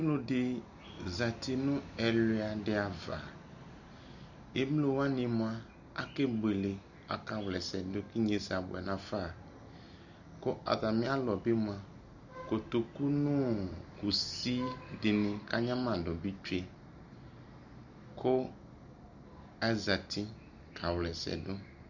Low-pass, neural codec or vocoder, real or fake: 7.2 kHz; none; real